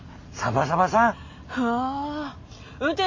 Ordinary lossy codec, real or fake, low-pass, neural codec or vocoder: MP3, 32 kbps; real; 7.2 kHz; none